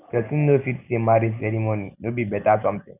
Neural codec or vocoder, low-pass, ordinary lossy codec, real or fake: none; 3.6 kHz; none; real